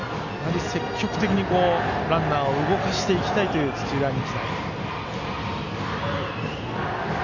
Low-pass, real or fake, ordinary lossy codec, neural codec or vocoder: 7.2 kHz; real; none; none